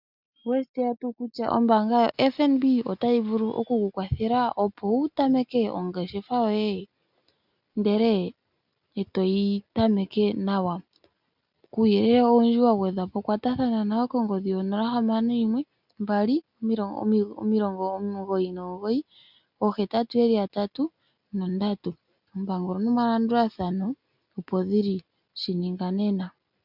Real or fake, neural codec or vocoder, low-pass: real; none; 5.4 kHz